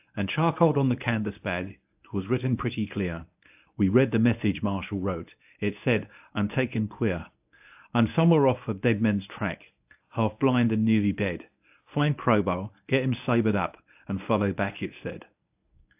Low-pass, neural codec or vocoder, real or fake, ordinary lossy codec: 3.6 kHz; codec, 24 kHz, 0.9 kbps, WavTokenizer, medium speech release version 1; fake; AAC, 32 kbps